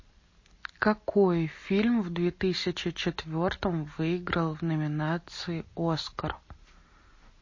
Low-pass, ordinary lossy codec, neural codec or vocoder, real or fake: 7.2 kHz; MP3, 32 kbps; none; real